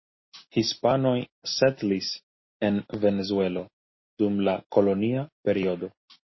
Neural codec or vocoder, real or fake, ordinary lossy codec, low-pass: none; real; MP3, 24 kbps; 7.2 kHz